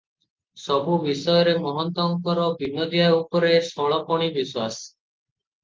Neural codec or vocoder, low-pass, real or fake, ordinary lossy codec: none; 7.2 kHz; real; Opus, 24 kbps